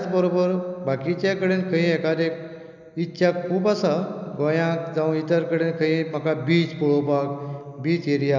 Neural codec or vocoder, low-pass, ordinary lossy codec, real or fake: none; 7.2 kHz; none; real